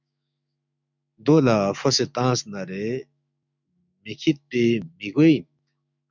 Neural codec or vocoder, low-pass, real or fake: autoencoder, 48 kHz, 128 numbers a frame, DAC-VAE, trained on Japanese speech; 7.2 kHz; fake